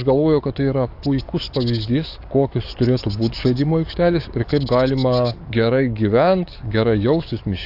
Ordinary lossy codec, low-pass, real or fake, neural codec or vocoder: AAC, 48 kbps; 5.4 kHz; fake; codec, 44.1 kHz, 7.8 kbps, DAC